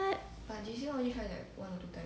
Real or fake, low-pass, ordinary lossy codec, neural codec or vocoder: real; none; none; none